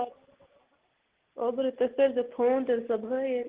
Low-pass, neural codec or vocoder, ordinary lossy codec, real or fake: 3.6 kHz; none; Opus, 16 kbps; real